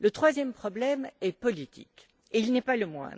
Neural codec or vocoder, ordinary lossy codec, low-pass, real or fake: none; none; none; real